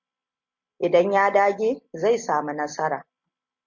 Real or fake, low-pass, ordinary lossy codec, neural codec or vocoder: real; 7.2 kHz; MP3, 48 kbps; none